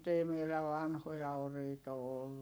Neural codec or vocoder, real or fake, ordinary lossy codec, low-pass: codec, 44.1 kHz, 7.8 kbps, Pupu-Codec; fake; none; none